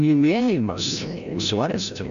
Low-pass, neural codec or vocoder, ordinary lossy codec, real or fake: 7.2 kHz; codec, 16 kHz, 0.5 kbps, FreqCodec, larger model; MP3, 96 kbps; fake